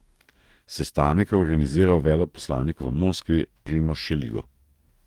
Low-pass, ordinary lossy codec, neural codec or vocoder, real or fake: 19.8 kHz; Opus, 32 kbps; codec, 44.1 kHz, 2.6 kbps, DAC; fake